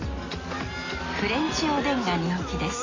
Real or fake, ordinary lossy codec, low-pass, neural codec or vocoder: real; AAC, 32 kbps; 7.2 kHz; none